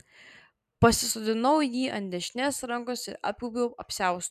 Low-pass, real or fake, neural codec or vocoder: 14.4 kHz; fake; vocoder, 44.1 kHz, 128 mel bands every 256 samples, BigVGAN v2